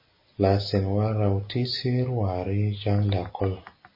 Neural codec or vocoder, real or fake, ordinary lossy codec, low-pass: none; real; MP3, 24 kbps; 5.4 kHz